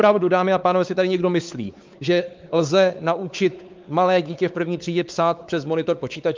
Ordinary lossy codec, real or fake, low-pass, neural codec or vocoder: Opus, 24 kbps; fake; 7.2 kHz; codec, 16 kHz, 4 kbps, X-Codec, WavLM features, trained on Multilingual LibriSpeech